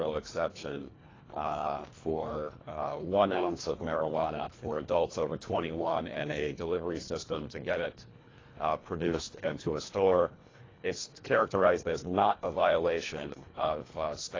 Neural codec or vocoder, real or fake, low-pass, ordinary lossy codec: codec, 24 kHz, 1.5 kbps, HILCodec; fake; 7.2 kHz; AAC, 32 kbps